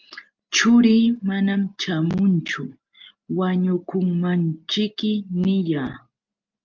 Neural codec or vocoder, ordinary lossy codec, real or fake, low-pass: none; Opus, 32 kbps; real; 7.2 kHz